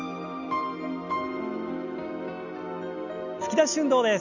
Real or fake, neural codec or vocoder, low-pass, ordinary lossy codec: real; none; 7.2 kHz; none